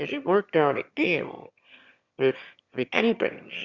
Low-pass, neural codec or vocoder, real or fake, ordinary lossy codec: 7.2 kHz; autoencoder, 22.05 kHz, a latent of 192 numbers a frame, VITS, trained on one speaker; fake; Opus, 64 kbps